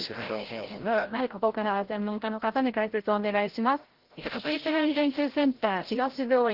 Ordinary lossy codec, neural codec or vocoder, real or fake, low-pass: Opus, 16 kbps; codec, 16 kHz, 0.5 kbps, FreqCodec, larger model; fake; 5.4 kHz